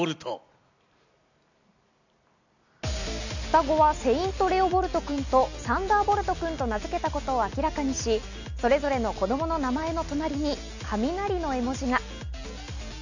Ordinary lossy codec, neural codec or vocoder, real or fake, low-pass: none; none; real; 7.2 kHz